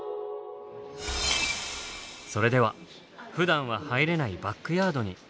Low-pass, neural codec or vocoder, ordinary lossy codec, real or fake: none; none; none; real